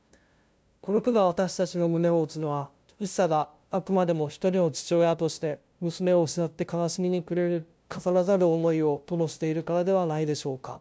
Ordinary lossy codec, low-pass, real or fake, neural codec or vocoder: none; none; fake; codec, 16 kHz, 0.5 kbps, FunCodec, trained on LibriTTS, 25 frames a second